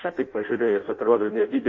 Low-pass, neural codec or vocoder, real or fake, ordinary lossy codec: 7.2 kHz; codec, 16 kHz in and 24 kHz out, 0.6 kbps, FireRedTTS-2 codec; fake; MP3, 32 kbps